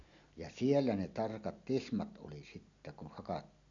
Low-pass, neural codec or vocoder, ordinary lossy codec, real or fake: 7.2 kHz; none; none; real